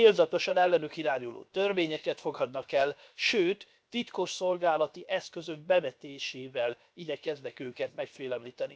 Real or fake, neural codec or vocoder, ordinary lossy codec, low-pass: fake; codec, 16 kHz, 0.7 kbps, FocalCodec; none; none